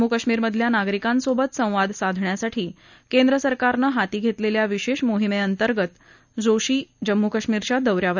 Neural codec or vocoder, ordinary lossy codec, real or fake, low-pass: none; none; real; 7.2 kHz